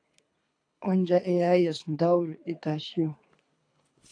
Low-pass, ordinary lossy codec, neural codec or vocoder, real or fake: 9.9 kHz; AAC, 48 kbps; codec, 24 kHz, 3 kbps, HILCodec; fake